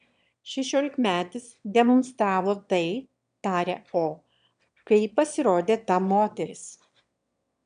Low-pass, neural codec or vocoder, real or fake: 9.9 kHz; autoencoder, 22.05 kHz, a latent of 192 numbers a frame, VITS, trained on one speaker; fake